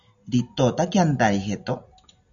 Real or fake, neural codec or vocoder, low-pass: real; none; 7.2 kHz